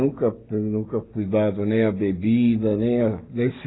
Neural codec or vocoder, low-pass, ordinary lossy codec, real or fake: none; 7.2 kHz; AAC, 16 kbps; real